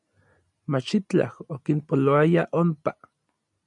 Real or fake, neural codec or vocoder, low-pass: real; none; 10.8 kHz